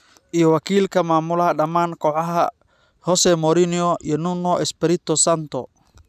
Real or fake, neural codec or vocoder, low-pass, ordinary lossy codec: real; none; 14.4 kHz; none